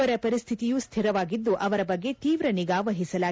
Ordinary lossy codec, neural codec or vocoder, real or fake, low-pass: none; none; real; none